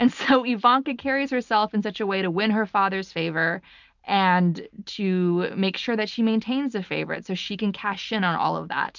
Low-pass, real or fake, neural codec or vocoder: 7.2 kHz; real; none